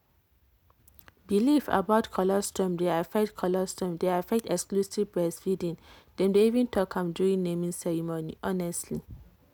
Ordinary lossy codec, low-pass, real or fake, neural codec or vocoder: none; none; real; none